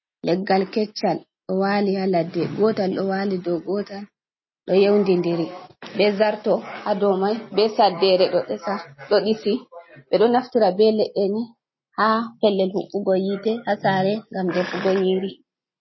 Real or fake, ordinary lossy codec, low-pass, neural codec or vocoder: real; MP3, 24 kbps; 7.2 kHz; none